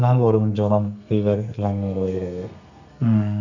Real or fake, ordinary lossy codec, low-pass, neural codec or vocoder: fake; none; 7.2 kHz; codec, 32 kHz, 1.9 kbps, SNAC